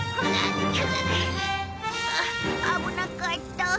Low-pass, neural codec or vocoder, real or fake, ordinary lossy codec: none; none; real; none